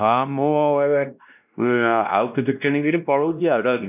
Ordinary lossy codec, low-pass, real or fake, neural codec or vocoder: none; 3.6 kHz; fake; codec, 16 kHz, 1 kbps, X-Codec, WavLM features, trained on Multilingual LibriSpeech